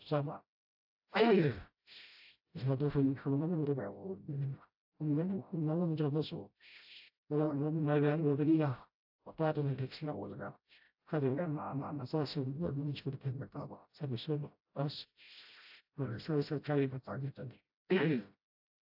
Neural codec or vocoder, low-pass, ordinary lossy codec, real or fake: codec, 16 kHz, 0.5 kbps, FreqCodec, smaller model; 5.4 kHz; AAC, 48 kbps; fake